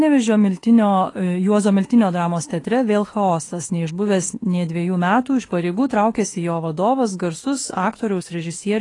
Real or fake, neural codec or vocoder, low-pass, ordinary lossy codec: fake; autoencoder, 48 kHz, 32 numbers a frame, DAC-VAE, trained on Japanese speech; 10.8 kHz; AAC, 32 kbps